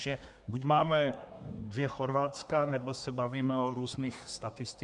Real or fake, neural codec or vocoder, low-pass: fake; codec, 24 kHz, 1 kbps, SNAC; 10.8 kHz